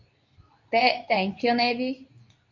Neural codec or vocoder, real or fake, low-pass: codec, 24 kHz, 0.9 kbps, WavTokenizer, medium speech release version 2; fake; 7.2 kHz